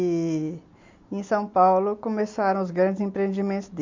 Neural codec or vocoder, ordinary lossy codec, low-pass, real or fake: none; MP3, 48 kbps; 7.2 kHz; real